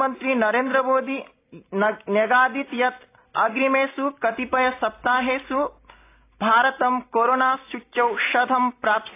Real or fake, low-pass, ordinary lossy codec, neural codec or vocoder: real; 3.6 kHz; AAC, 24 kbps; none